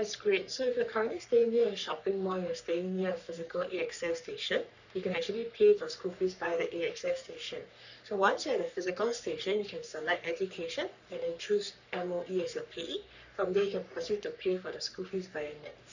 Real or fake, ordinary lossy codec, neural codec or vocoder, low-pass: fake; none; codec, 44.1 kHz, 3.4 kbps, Pupu-Codec; 7.2 kHz